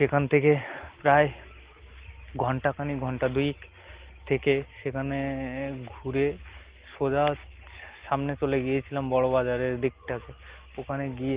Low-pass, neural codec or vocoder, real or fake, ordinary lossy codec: 3.6 kHz; none; real; Opus, 16 kbps